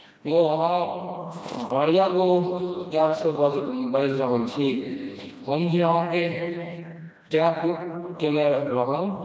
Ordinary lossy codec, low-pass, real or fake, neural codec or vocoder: none; none; fake; codec, 16 kHz, 1 kbps, FreqCodec, smaller model